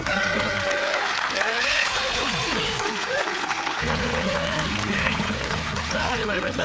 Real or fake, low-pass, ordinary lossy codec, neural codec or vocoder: fake; none; none; codec, 16 kHz, 4 kbps, FreqCodec, larger model